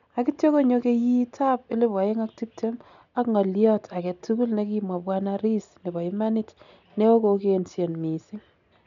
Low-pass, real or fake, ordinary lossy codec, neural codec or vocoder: 7.2 kHz; real; none; none